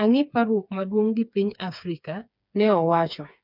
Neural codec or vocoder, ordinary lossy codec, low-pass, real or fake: codec, 16 kHz, 4 kbps, FreqCodec, smaller model; none; 5.4 kHz; fake